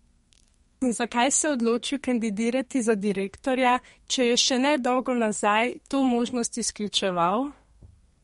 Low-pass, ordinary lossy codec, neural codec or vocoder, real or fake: 14.4 kHz; MP3, 48 kbps; codec, 32 kHz, 1.9 kbps, SNAC; fake